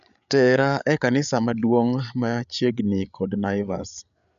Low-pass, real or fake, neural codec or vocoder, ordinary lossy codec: 7.2 kHz; fake; codec, 16 kHz, 8 kbps, FreqCodec, larger model; none